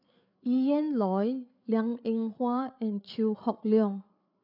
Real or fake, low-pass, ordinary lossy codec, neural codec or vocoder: fake; 5.4 kHz; none; codec, 16 kHz, 8 kbps, FreqCodec, larger model